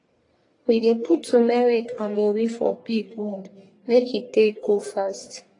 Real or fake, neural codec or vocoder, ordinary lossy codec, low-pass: fake; codec, 44.1 kHz, 1.7 kbps, Pupu-Codec; AAC, 32 kbps; 10.8 kHz